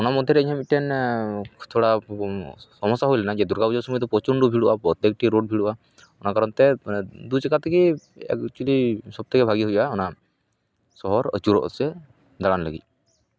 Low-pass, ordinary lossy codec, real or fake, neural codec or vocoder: none; none; real; none